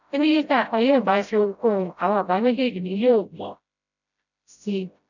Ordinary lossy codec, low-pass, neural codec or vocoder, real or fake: none; 7.2 kHz; codec, 16 kHz, 0.5 kbps, FreqCodec, smaller model; fake